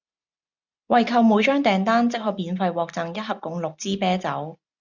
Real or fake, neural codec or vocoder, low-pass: real; none; 7.2 kHz